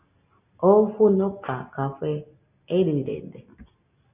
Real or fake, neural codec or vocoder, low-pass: real; none; 3.6 kHz